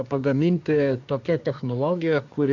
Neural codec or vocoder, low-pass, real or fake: codec, 24 kHz, 1 kbps, SNAC; 7.2 kHz; fake